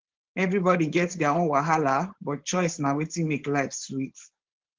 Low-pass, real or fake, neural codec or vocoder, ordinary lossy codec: 7.2 kHz; fake; codec, 16 kHz, 4.8 kbps, FACodec; Opus, 16 kbps